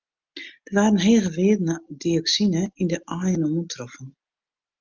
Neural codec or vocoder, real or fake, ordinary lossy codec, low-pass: none; real; Opus, 24 kbps; 7.2 kHz